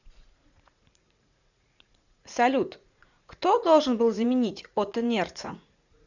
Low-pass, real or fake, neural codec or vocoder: 7.2 kHz; fake; vocoder, 44.1 kHz, 80 mel bands, Vocos